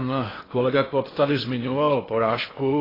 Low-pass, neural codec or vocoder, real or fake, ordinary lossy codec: 5.4 kHz; codec, 16 kHz in and 24 kHz out, 0.8 kbps, FocalCodec, streaming, 65536 codes; fake; AAC, 24 kbps